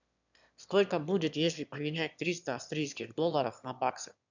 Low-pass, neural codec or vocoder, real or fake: 7.2 kHz; autoencoder, 22.05 kHz, a latent of 192 numbers a frame, VITS, trained on one speaker; fake